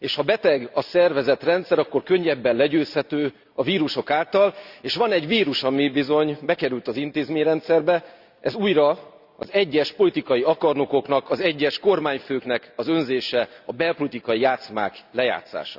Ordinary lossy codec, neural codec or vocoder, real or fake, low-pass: Opus, 64 kbps; none; real; 5.4 kHz